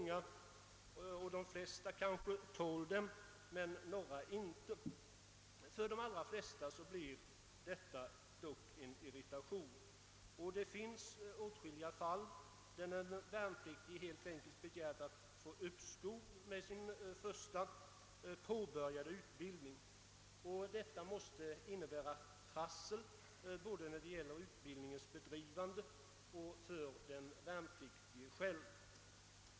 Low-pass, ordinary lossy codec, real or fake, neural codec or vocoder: none; none; real; none